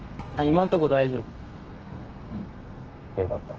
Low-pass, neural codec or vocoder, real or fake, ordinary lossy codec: 7.2 kHz; codec, 32 kHz, 1.9 kbps, SNAC; fake; Opus, 24 kbps